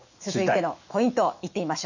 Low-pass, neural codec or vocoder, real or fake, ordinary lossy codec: 7.2 kHz; autoencoder, 48 kHz, 128 numbers a frame, DAC-VAE, trained on Japanese speech; fake; none